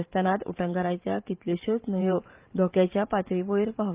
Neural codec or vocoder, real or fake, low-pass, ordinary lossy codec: vocoder, 44.1 kHz, 128 mel bands every 512 samples, BigVGAN v2; fake; 3.6 kHz; Opus, 24 kbps